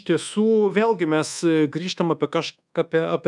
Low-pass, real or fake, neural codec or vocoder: 10.8 kHz; fake; codec, 24 kHz, 1.2 kbps, DualCodec